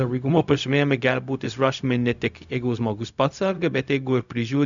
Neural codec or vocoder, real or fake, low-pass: codec, 16 kHz, 0.4 kbps, LongCat-Audio-Codec; fake; 7.2 kHz